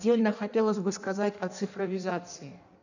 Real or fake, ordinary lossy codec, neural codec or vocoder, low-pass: fake; none; codec, 16 kHz in and 24 kHz out, 1.1 kbps, FireRedTTS-2 codec; 7.2 kHz